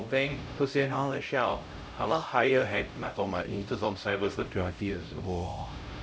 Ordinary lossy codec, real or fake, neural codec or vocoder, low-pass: none; fake; codec, 16 kHz, 0.5 kbps, X-Codec, HuBERT features, trained on LibriSpeech; none